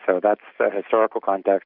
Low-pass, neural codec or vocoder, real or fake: 5.4 kHz; none; real